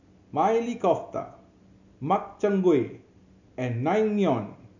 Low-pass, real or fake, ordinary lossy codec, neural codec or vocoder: 7.2 kHz; real; none; none